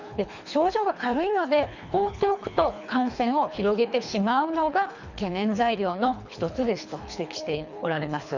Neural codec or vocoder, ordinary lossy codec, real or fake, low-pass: codec, 24 kHz, 3 kbps, HILCodec; none; fake; 7.2 kHz